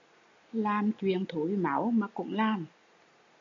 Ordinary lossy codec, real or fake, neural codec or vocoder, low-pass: AAC, 64 kbps; real; none; 7.2 kHz